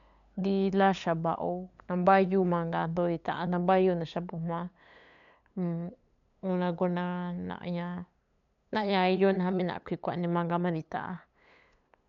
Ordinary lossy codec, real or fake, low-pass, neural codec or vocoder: none; fake; 7.2 kHz; codec, 16 kHz, 8 kbps, FunCodec, trained on LibriTTS, 25 frames a second